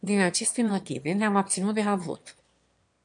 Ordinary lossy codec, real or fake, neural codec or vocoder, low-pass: MP3, 64 kbps; fake; autoencoder, 22.05 kHz, a latent of 192 numbers a frame, VITS, trained on one speaker; 9.9 kHz